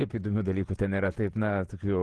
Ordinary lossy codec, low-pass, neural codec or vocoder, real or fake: Opus, 16 kbps; 10.8 kHz; vocoder, 48 kHz, 128 mel bands, Vocos; fake